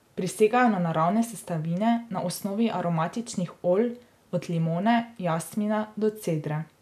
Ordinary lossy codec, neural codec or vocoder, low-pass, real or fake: none; none; 14.4 kHz; real